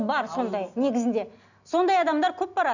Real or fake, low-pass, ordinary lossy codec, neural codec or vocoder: real; 7.2 kHz; none; none